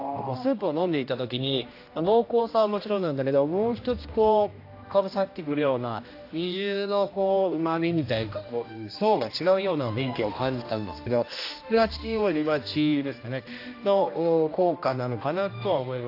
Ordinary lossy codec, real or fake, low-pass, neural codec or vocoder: AAC, 32 kbps; fake; 5.4 kHz; codec, 16 kHz, 1 kbps, X-Codec, HuBERT features, trained on general audio